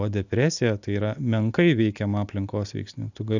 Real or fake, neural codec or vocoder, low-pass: real; none; 7.2 kHz